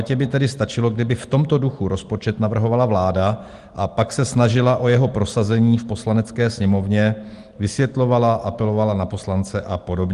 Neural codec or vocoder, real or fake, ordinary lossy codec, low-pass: none; real; Opus, 24 kbps; 10.8 kHz